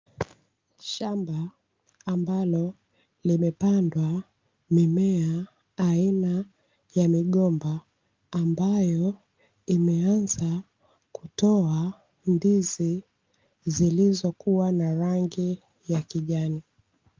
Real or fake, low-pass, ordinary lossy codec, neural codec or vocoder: real; 7.2 kHz; Opus, 24 kbps; none